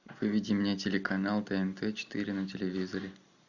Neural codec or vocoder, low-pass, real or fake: none; 7.2 kHz; real